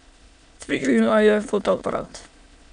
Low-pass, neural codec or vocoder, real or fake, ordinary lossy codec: 9.9 kHz; autoencoder, 22.05 kHz, a latent of 192 numbers a frame, VITS, trained on many speakers; fake; MP3, 96 kbps